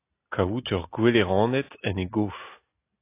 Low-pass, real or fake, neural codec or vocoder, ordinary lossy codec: 3.6 kHz; real; none; AAC, 24 kbps